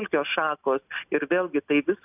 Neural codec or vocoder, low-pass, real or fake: none; 3.6 kHz; real